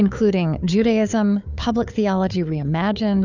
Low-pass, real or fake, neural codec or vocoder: 7.2 kHz; fake; codec, 16 kHz, 4 kbps, FunCodec, trained on Chinese and English, 50 frames a second